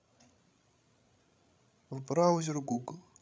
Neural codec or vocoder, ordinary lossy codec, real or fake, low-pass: codec, 16 kHz, 16 kbps, FreqCodec, larger model; none; fake; none